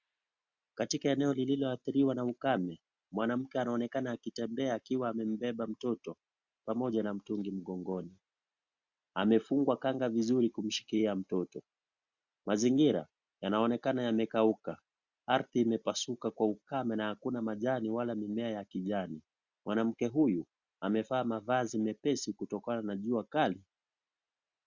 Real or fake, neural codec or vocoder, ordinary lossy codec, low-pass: real; none; Opus, 64 kbps; 7.2 kHz